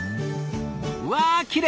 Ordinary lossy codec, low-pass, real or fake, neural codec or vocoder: none; none; real; none